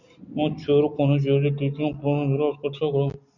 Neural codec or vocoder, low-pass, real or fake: none; 7.2 kHz; real